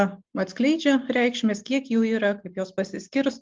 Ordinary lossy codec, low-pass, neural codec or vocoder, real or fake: Opus, 24 kbps; 7.2 kHz; none; real